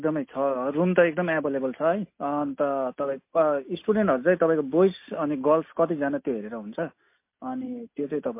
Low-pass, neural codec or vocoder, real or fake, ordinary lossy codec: 3.6 kHz; none; real; MP3, 24 kbps